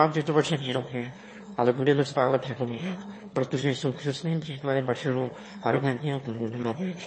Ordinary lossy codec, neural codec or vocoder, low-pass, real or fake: MP3, 32 kbps; autoencoder, 22.05 kHz, a latent of 192 numbers a frame, VITS, trained on one speaker; 9.9 kHz; fake